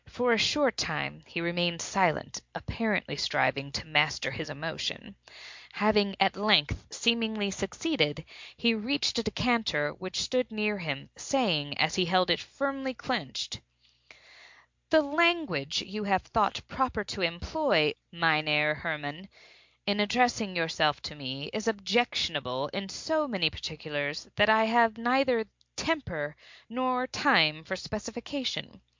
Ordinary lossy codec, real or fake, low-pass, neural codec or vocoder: MP3, 64 kbps; real; 7.2 kHz; none